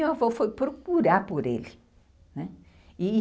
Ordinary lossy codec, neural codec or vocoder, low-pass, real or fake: none; none; none; real